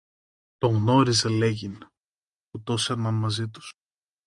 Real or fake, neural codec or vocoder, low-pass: real; none; 10.8 kHz